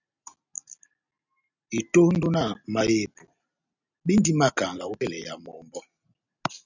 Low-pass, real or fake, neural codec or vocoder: 7.2 kHz; real; none